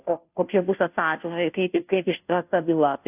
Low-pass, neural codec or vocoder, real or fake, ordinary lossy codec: 3.6 kHz; codec, 16 kHz, 0.5 kbps, FunCodec, trained on Chinese and English, 25 frames a second; fake; AAC, 32 kbps